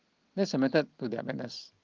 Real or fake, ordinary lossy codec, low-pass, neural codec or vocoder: real; Opus, 32 kbps; 7.2 kHz; none